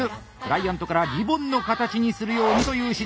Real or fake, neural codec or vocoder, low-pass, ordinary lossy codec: real; none; none; none